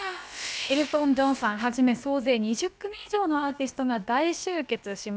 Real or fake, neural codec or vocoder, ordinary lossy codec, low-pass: fake; codec, 16 kHz, about 1 kbps, DyCAST, with the encoder's durations; none; none